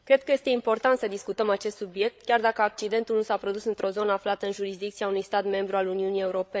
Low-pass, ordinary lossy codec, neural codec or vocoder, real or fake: none; none; codec, 16 kHz, 8 kbps, FreqCodec, larger model; fake